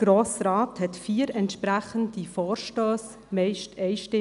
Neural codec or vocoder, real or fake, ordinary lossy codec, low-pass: none; real; none; 10.8 kHz